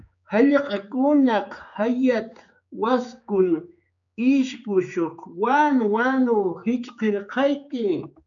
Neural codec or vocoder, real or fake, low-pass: codec, 16 kHz, 4 kbps, X-Codec, HuBERT features, trained on general audio; fake; 7.2 kHz